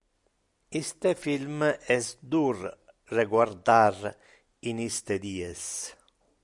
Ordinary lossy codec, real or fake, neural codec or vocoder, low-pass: MP3, 96 kbps; real; none; 10.8 kHz